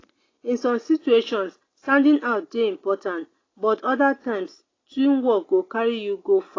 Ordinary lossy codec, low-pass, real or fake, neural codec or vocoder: AAC, 32 kbps; 7.2 kHz; real; none